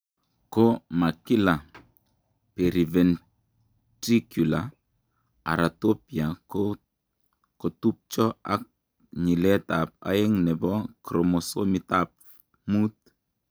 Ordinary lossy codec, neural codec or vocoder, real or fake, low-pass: none; none; real; none